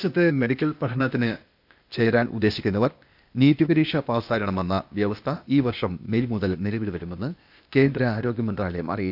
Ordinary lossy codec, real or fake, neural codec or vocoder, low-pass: none; fake; codec, 16 kHz, 0.8 kbps, ZipCodec; 5.4 kHz